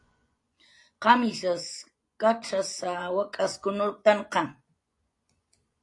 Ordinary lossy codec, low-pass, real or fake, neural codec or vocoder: AAC, 48 kbps; 10.8 kHz; fake; vocoder, 24 kHz, 100 mel bands, Vocos